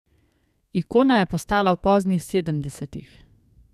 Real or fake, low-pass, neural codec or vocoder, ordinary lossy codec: fake; 14.4 kHz; codec, 32 kHz, 1.9 kbps, SNAC; none